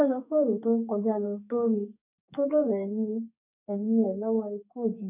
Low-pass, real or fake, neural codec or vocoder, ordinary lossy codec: 3.6 kHz; fake; codec, 32 kHz, 1.9 kbps, SNAC; none